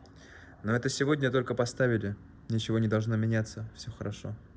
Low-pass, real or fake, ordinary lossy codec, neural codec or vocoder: none; real; none; none